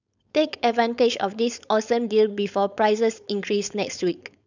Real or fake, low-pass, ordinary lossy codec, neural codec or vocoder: fake; 7.2 kHz; none; codec, 16 kHz, 4.8 kbps, FACodec